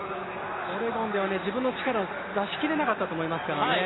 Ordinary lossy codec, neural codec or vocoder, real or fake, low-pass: AAC, 16 kbps; none; real; 7.2 kHz